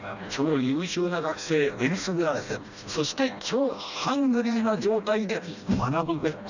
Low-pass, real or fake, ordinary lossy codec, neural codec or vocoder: 7.2 kHz; fake; none; codec, 16 kHz, 1 kbps, FreqCodec, smaller model